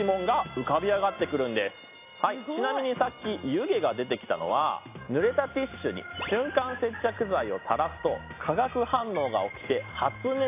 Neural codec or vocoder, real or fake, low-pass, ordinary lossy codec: none; real; 3.6 kHz; AAC, 24 kbps